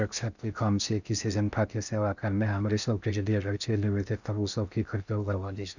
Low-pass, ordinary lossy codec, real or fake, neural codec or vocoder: 7.2 kHz; none; fake; codec, 16 kHz in and 24 kHz out, 0.6 kbps, FocalCodec, streaming, 4096 codes